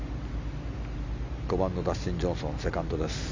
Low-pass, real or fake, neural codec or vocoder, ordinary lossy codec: 7.2 kHz; real; none; MP3, 64 kbps